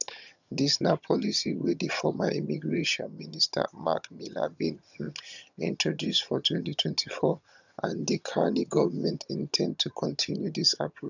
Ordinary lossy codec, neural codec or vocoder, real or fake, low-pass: none; vocoder, 22.05 kHz, 80 mel bands, HiFi-GAN; fake; 7.2 kHz